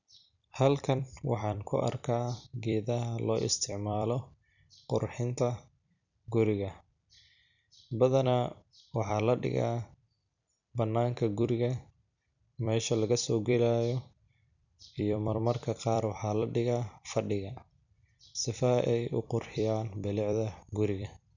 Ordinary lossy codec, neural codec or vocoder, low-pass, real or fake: none; vocoder, 44.1 kHz, 128 mel bands every 256 samples, BigVGAN v2; 7.2 kHz; fake